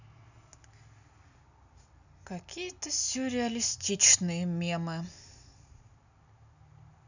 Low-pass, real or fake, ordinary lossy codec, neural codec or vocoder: 7.2 kHz; real; none; none